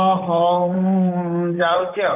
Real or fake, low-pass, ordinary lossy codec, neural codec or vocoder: fake; 3.6 kHz; none; vocoder, 44.1 kHz, 128 mel bands, Pupu-Vocoder